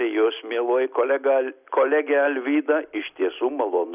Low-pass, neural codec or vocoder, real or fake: 3.6 kHz; none; real